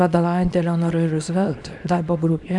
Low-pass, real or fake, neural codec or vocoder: 10.8 kHz; fake; codec, 24 kHz, 0.9 kbps, WavTokenizer, small release